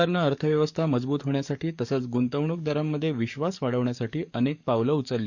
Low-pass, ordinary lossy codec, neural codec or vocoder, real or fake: 7.2 kHz; none; codec, 44.1 kHz, 7.8 kbps, DAC; fake